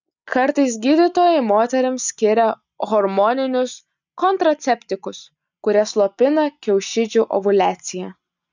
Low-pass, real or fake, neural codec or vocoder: 7.2 kHz; real; none